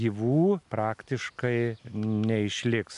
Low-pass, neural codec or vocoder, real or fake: 10.8 kHz; none; real